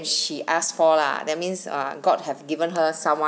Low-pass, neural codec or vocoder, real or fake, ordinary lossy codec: none; none; real; none